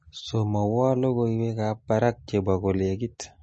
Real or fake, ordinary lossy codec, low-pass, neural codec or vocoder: real; MP3, 32 kbps; 10.8 kHz; none